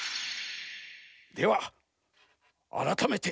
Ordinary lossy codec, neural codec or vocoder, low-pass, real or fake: none; none; none; real